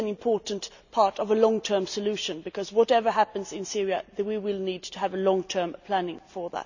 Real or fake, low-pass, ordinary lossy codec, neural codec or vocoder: real; 7.2 kHz; none; none